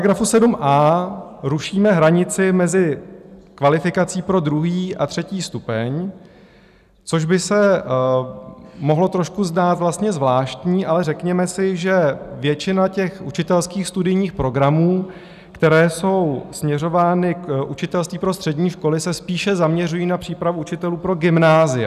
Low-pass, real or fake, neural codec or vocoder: 14.4 kHz; real; none